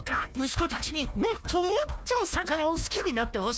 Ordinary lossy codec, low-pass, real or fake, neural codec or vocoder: none; none; fake; codec, 16 kHz, 1 kbps, FunCodec, trained on Chinese and English, 50 frames a second